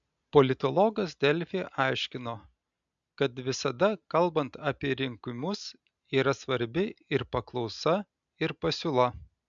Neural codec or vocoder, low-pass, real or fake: none; 7.2 kHz; real